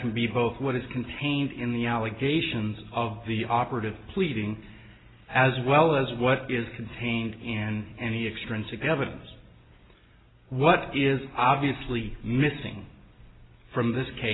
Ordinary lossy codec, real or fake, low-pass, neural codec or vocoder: AAC, 16 kbps; real; 7.2 kHz; none